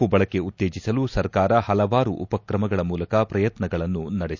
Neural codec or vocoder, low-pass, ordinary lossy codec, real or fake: none; 7.2 kHz; none; real